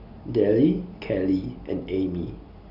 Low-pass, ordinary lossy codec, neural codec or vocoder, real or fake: 5.4 kHz; Opus, 64 kbps; none; real